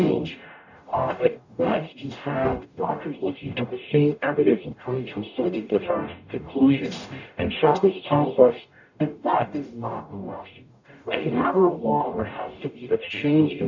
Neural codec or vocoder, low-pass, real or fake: codec, 44.1 kHz, 0.9 kbps, DAC; 7.2 kHz; fake